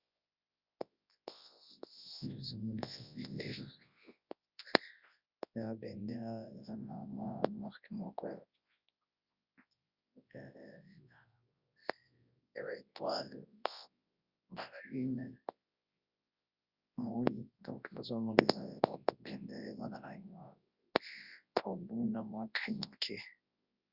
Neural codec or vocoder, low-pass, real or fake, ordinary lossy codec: codec, 24 kHz, 0.9 kbps, WavTokenizer, large speech release; 5.4 kHz; fake; Opus, 64 kbps